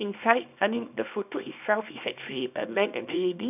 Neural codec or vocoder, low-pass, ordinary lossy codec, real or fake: codec, 24 kHz, 0.9 kbps, WavTokenizer, small release; 3.6 kHz; none; fake